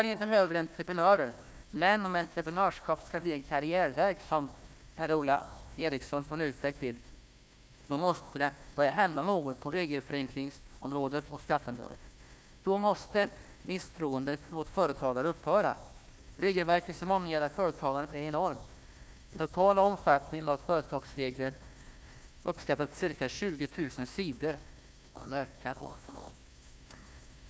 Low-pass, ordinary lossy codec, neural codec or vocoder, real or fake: none; none; codec, 16 kHz, 1 kbps, FunCodec, trained on Chinese and English, 50 frames a second; fake